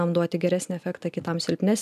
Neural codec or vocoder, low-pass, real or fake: none; 14.4 kHz; real